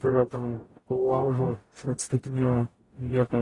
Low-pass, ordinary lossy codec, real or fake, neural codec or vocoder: 10.8 kHz; AAC, 32 kbps; fake; codec, 44.1 kHz, 0.9 kbps, DAC